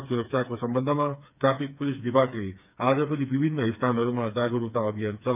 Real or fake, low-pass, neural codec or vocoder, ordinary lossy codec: fake; 3.6 kHz; codec, 16 kHz, 4 kbps, FreqCodec, smaller model; none